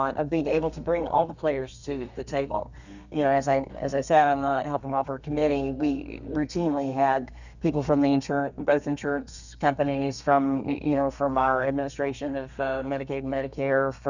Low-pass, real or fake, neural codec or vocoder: 7.2 kHz; fake; codec, 32 kHz, 1.9 kbps, SNAC